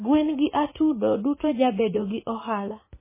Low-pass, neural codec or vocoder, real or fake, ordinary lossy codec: 3.6 kHz; vocoder, 44.1 kHz, 80 mel bands, Vocos; fake; MP3, 16 kbps